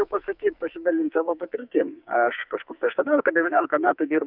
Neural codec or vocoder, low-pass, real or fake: codec, 44.1 kHz, 2.6 kbps, SNAC; 5.4 kHz; fake